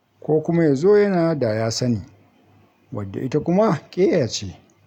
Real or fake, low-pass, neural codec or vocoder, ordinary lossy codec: real; 19.8 kHz; none; none